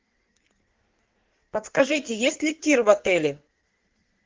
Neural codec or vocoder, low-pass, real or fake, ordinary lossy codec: codec, 16 kHz in and 24 kHz out, 1.1 kbps, FireRedTTS-2 codec; 7.2 kHz; fake; Opus, 16 kbps